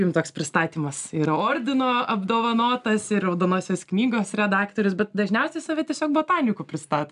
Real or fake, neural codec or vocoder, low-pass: real; none; 10.8 kHz